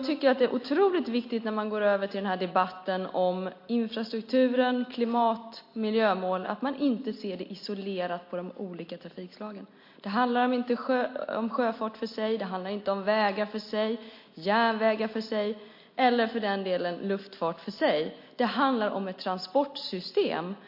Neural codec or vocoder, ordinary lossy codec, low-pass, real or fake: none; MP3, 32 kbps; 5.4 kHz; real